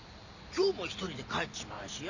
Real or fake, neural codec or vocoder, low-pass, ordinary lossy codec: real; none; 7.2 kHz; none